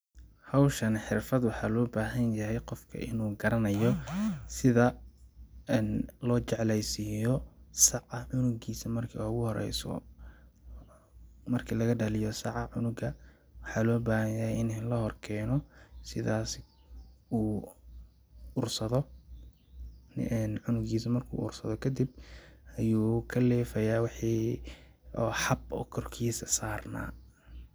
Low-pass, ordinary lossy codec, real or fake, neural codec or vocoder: none; none; real; none